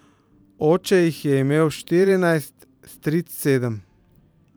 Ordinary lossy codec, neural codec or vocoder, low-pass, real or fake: none; vocoder, 44.1 kHz, 128 mel bands every 512 samples, BigVGAN v2; none; fake